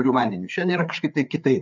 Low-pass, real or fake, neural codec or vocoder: 7.2 kHz; fake; codec, 16 kHz, 4 kbps, FreqCodec, larger model